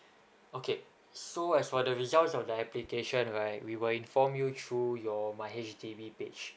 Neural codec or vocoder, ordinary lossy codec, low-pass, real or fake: none; none; none; real